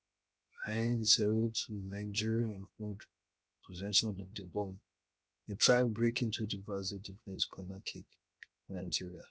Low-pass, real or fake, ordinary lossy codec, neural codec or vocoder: none; fake; none; codec, 16 kHz, 0.7 kbps, FocalCodec